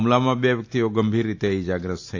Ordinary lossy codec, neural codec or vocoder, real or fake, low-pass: AAC, 48 kbps; none; real; 7.2 kHz